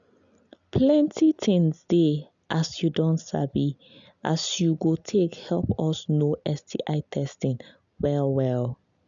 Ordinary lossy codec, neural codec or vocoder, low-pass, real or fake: none; none; 7.2 kHz; real